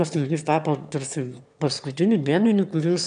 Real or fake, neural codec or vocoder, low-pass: fake; autoencoder, 22.05 kHz, a latent of 192 numbers a frame, VITS, trained on one speaker; 9.9 kHz